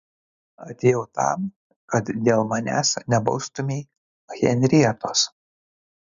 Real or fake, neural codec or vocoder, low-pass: real; none; 7.2 kHz